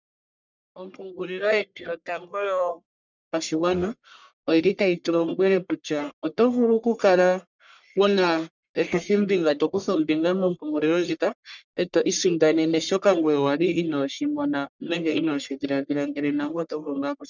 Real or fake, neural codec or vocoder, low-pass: fake; codec, 44.1 kHz, 1.7 kbps, Pupu-Codec; 7.2 kHz